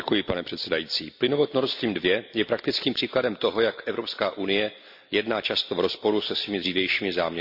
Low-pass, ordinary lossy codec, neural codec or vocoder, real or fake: 5.4 kHz; AAC, 48 kbps; none; real